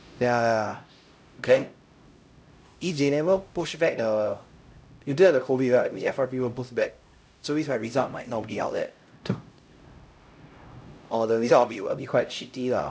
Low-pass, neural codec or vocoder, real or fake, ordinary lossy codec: none; codec, 16 kHz, 0.5 kbps, X-Codec, HuBERT features, trained on LibriSpeech; fake; none